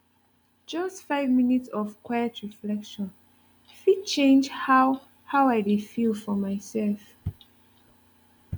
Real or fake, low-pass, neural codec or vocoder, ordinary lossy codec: real; none; none; none